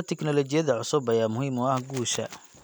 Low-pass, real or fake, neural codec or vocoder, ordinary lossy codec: none; real; none; none